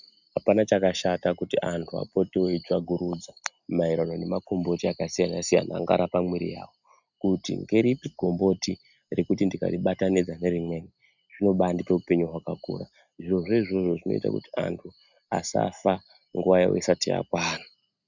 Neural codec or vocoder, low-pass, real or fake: none; 7.2 kHz; real